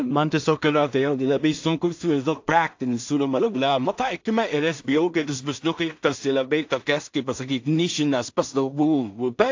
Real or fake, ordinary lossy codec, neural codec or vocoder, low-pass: fake; AAC, 48 kbps; codec, 16 kHz in and 24 kHz out, 0.4 kbps, LongCat-Audio-Codec, two codebook decoder; 7.2 kHz